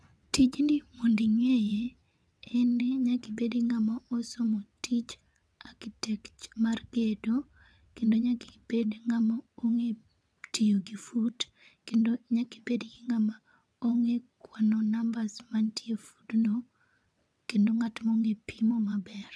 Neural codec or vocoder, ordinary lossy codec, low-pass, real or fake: vocoder, 22.05 kHz, 80 mel bands, WaveNeXt; none; none; fake